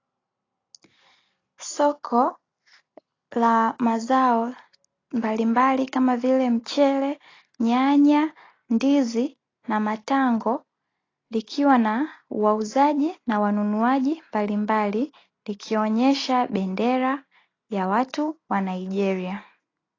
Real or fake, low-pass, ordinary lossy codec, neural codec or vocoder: real; 7.2 kHz; AAC, 32 kbps; none